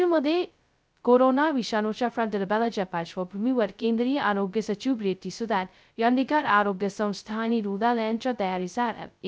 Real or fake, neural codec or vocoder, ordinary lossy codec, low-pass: fake; codec, 16 kHz, 0.2 kbps, FocalCodec; none; none